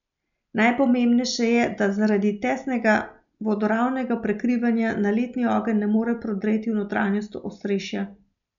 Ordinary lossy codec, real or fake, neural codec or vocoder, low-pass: none; real; none; 7.2 kHz